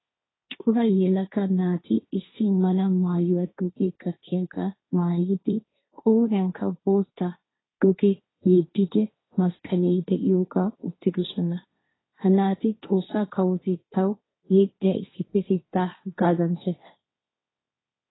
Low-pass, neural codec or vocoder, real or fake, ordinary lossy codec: 7.2 kHz; codec, 16 kHz, 1.1 kbps, Voila-Tokenizer; fake; AAC, 16 kbps